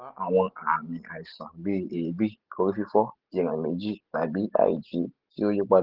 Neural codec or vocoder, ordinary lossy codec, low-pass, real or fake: none; Opus, 16 kbps; 5.4 kHz; real